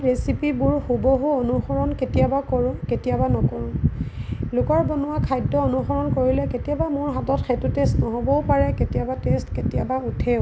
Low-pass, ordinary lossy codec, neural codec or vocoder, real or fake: none; none; none; real